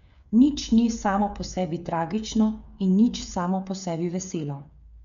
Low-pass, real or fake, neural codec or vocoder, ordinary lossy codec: 7.2 kHz; fake; codec, 16 kHz, 8 kbps, FreqCodec, smaller model; none